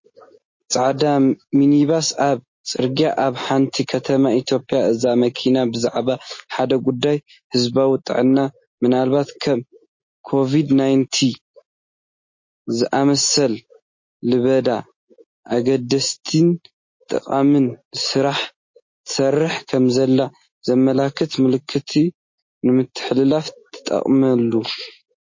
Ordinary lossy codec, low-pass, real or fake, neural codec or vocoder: MP3, 32 kbps; 7.2 kHz; real; none